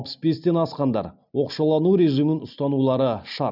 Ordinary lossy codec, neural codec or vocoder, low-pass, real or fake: none; none; 5.4 kHz; real